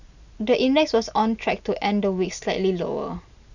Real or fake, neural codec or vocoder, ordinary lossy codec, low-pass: real; none; none; 7.2 kHz